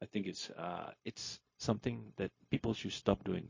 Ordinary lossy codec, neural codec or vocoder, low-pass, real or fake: MP3, 32 kbps; codec, 16 kHz, 0.4 kbps, LongCat-Audio-Codec; 7.2 kHz; fake